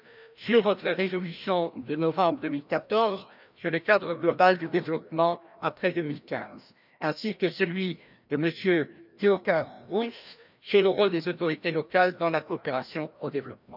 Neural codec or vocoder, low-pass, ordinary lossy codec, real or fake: codec, 16 kHz, 1 kbps, FreqCodec, larger model; 5.4 kHz; none; fake